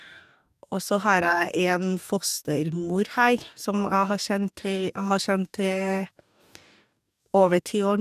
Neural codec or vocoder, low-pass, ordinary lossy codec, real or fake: codec, 44.1 kHz, 2.6 kbps, DAC; 14.4 kHz; none; fake